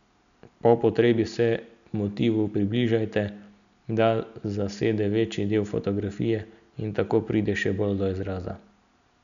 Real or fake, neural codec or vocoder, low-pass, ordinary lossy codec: real; none; 7.2 kHz; none